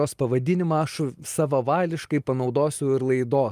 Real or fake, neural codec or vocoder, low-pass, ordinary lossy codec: real; none; 14.4 kHz; Opus, 32 kbps